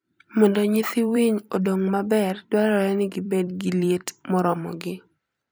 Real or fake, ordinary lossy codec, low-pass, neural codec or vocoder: fake; none; none; vocoder, 44.1 kHz, 128 mel bands every 256 samples, BigVGAN v2